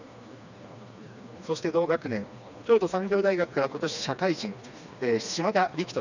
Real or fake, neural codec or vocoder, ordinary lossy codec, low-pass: fake; codec, 16 kHz, 2 kbps, FreqCodec, smaller model; none; 7.2 kHz